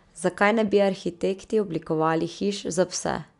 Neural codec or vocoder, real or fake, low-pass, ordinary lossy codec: none; real; 10.8 kHz; none